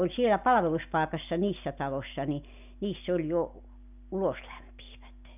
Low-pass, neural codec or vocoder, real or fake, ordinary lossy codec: 3.6 kHz; none; real; none